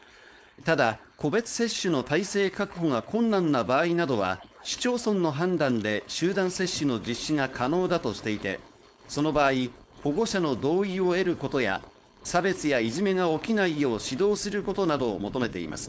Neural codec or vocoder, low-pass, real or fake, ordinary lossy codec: codec, 16 kHz, 4.8 kbps, FACodec; none; fake; none